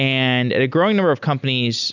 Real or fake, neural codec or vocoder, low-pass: real; none; 7.2 kHz